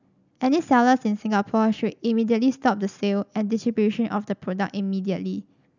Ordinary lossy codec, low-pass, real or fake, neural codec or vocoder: none; 7.2 kHz; real; none